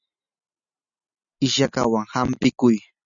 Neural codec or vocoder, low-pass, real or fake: none; 7.2 kHz; real